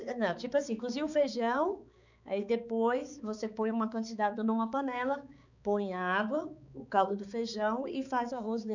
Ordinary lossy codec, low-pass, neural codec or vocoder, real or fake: none; 7.2 kHz; codec, 16 kHz, 4 kbps, X-Codec, HuBERT features, trained on balanced general audio; fake